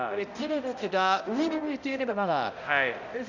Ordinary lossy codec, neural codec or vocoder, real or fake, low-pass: none; codec, 16 kHz, 0.5 kbps, X-Codec, HuBERT features, trained on balanced general audio; fake; 7.2 kHz